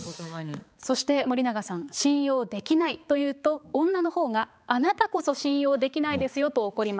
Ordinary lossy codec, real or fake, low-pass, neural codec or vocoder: none; fake; none; codec, 16 kHz, 4 kbps, X-Codec, HuBERT features, trained on balanced general audio